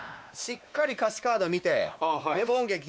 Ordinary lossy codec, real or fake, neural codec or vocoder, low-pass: none; fake; codec, 16 kHz, 2 kbps, X-Codec, WavLM features, trained on Multilingual LibriSpeech; none